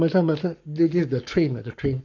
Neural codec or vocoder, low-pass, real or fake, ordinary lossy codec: codec, 44.1 kHz, 7.8 kbps, Pupu-Codec; 7.2 kHz; fake; none